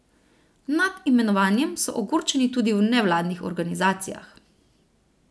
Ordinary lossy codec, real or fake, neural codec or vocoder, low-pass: none; real; none; none